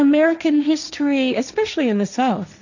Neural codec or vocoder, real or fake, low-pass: codec, 16 kHz, 1.1 kbps, Voila-Tokenizer; fake; 7.2 kHz